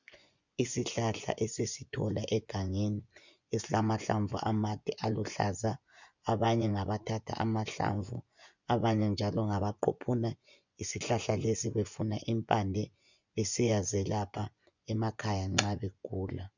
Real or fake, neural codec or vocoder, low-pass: fake; vocoder, 24 kHz, 100 mel bands, Vocos; 7.2 kHz